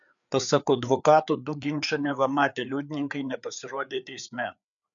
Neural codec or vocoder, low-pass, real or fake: codec, 16 kHz, 4 kbps, FreqCodec, larger model; 7.2 kHz; fake